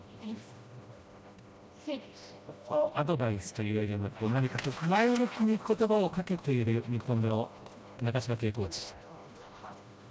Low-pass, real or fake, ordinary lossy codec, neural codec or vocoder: none; fake; none; codec, 16 kHz, 1 kbps, FreqCodec, smaller model